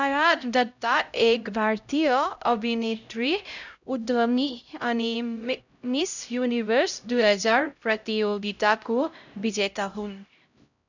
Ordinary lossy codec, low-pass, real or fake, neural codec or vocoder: none; 7.2 kHz; fake; codec, 16 kHz, 0.5 kbps, X-Codec, HuBERT features, trained on LibriSpeech